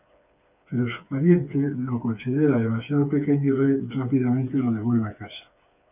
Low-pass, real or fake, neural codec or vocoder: 3.6 kHz; fake; codec, 16 kHz, 4 kbps, FreqCodec, smaller model